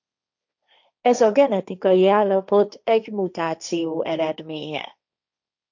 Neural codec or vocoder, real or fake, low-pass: codec, 16 kHz, 1.1 kbps, Voila-Tokenizer; fake; 7.2 kHz